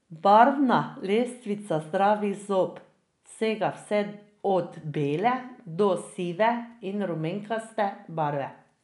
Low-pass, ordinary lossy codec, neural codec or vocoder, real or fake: 10.8 kHz; none; none; real